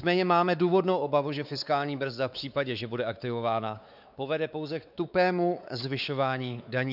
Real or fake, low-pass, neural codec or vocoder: fake; 5.4 kHz; codec, 16 kHz, 4 kbps, X-Codec, WavLM features, trained on Multilingual LibriSpeech